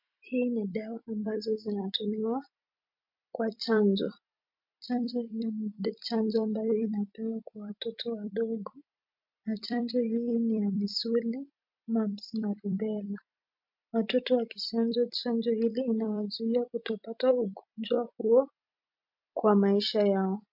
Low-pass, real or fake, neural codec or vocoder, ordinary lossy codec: 5.4 kHz; fake; vocoder, 44.1 kHz, 128 mel bands every 256 samples, BigVGAN v2; MP3, 48 kbps